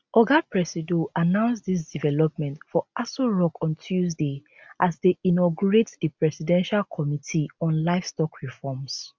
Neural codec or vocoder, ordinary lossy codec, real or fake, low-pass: none; none; real; none